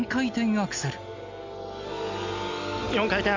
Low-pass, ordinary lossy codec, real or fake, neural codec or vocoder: 7.2 kHz; MP3, 64 kbps; real; none